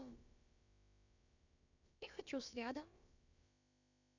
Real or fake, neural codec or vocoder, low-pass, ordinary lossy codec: fake; codec, 16 kHz, about 1 kbps, DyCAST, with the encoder's durations; 7.2 kHz; none